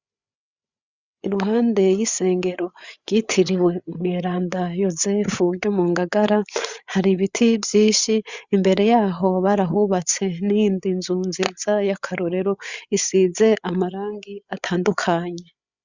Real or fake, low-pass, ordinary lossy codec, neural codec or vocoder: fake; 7.2 kHz; Opus, 64 kbps; codec, 16 kHz, 8 kbps, FreqCodec, larger model